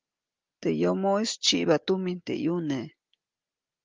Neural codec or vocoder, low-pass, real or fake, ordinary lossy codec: none; 7.2 kHz; real; Opus, 24 kbps